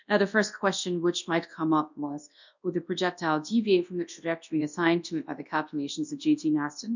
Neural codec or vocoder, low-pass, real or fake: codec, 24 kHz, 0.9 kbps, WavTokenizer, large speech release; 7.2 kHz; fake